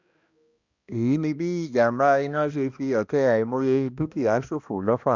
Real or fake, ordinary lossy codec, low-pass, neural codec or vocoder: fake; Opus, 64 kbps; 7.2 kHz; codec, 16 kHz, 1 kbps, X-Codec, HuBERT features, trained on balanced general audio